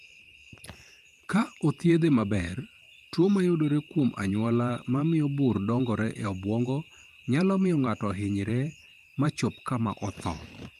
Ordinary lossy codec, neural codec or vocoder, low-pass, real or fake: Opus, 32 kbps; vocoder, 44.1 kHz, 128 mel bands every 512 samples, BigVGAN v2; 14.4 kHz; fake